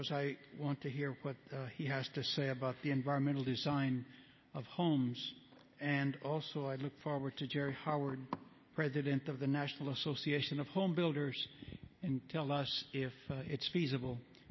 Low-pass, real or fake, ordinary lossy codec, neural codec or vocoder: 7.2 kHz; real; MP3, 24 kbps; none